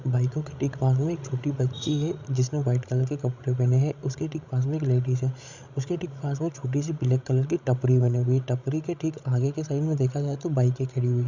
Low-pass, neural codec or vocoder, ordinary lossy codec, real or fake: 7.2 kHz; codec, 16 kHz, 16 kbps, FreqCodec, larger model; Opus, 64 kbps; fake